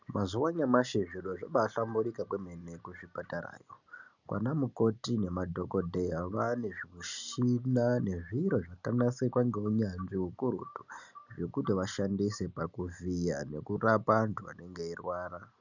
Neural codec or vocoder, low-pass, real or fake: none; 7.2 kHz; real